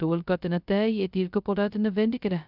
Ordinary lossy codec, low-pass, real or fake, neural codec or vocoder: none; 5.4 kHz; fake; codec, 16 kHz, 0.2 kbps, FocalCodec